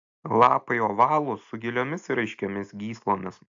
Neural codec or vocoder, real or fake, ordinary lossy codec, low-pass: none; real; AAC, 64 kbps; 7.2 kHz